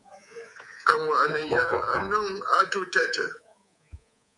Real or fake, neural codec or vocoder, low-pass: fake; codec, 24 kHz, 3.1 kbps, DualCodec; 10.8 kHz